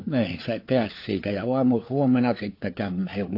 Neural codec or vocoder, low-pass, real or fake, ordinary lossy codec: codec, 16 kHz, 4 kbps, FunCodec, trained on LibriTTS, 50 frames a second; 5.4 kHz; fake; AAC, 32 kbps